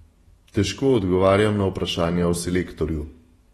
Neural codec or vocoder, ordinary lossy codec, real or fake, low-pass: vocoder, 48 kHz, 128 mel bands, Vocos; AAC, 32 kbps; fake; 19.8 kHz